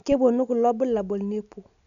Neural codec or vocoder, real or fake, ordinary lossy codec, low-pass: none; real; none; 7.2 kHz